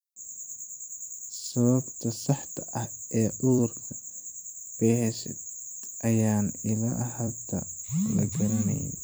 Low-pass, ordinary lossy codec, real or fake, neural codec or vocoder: none; none; fake; vocoder, 44.1 kHz, 128 mel bands every 256 samples, BigVGAN v2